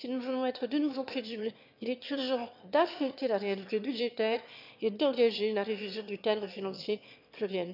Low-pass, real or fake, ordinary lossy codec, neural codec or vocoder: 5.4 kHz; fake; none; autoencoder, 22.05 kHz, a latent of 192 numbers a frame, VITS, trained on one speaker